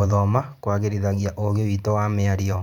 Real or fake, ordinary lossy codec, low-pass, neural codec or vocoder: fake; none; 19.8 kHz; vocoder, 44.1 kHz, 128 mel bands every 512 samples, BigVGAN v2